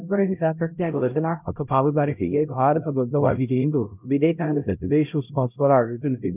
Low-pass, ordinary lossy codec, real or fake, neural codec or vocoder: 3.6 kHz; none; fake; codec, 16 kHz, 0.5 kbps, X-Codec, HuBERT features, trained on LibriSpeech